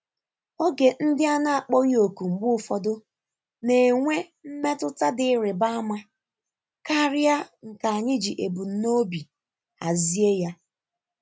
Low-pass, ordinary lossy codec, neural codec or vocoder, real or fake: none; none; none; real